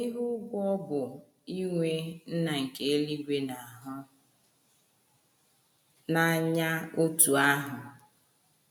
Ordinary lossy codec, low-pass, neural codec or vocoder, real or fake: none; none; none; real